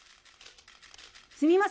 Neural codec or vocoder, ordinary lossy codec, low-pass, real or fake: none; none; none; real